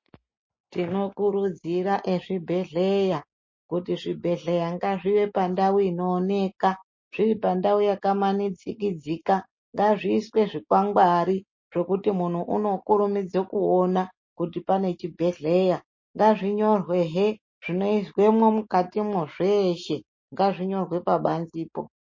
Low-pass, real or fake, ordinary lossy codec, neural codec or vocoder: 7.2 kHz; real; MP3, 32 kbps; none